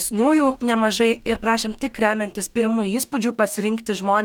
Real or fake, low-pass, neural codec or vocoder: fake; 19.8 kHz; codec, 44.1 kHz, 2.6 kbps, DAC